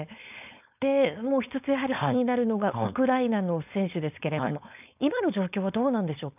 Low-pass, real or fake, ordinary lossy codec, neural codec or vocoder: 3.6 kHz; fake; none; codec, 16 kHz, 4.8 kbps, FACodec